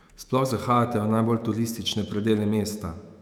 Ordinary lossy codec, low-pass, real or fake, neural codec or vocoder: none; 19.8 kHz; fake; autoencoder, 48 kHz, 128 numbers a frame, DAC-VAE, trained on Japanese speech